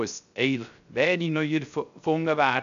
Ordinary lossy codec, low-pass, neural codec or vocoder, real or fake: none; 7.2 kHz; codec, 16 kHz, 0.3 kbps, FocalCodec; fake